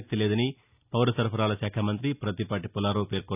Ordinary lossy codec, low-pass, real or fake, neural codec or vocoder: none; 3.6 kHz; real; none